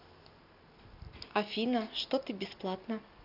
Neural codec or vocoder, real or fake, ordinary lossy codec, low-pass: none; real; none; 5.4 kHz